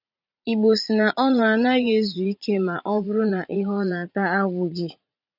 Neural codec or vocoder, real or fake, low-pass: vocoder, 44.1 kHz, 80 mel bands, Vocos; fake; 5.4 kHz